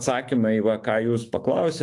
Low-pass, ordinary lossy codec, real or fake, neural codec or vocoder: 10.8 kHz; AAC, 48 kbps; fake; autoencoder, 48 kHz, 128 numbers a frame, DAC-VAE, trained on Japanese speech